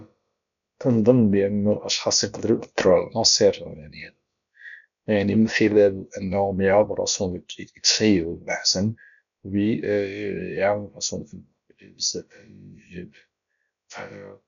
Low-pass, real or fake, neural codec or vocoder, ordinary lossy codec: 7.2 kHz; fake; codec, 16 kHz, about 1 kbps, DyCAST, with the encoder's durations; none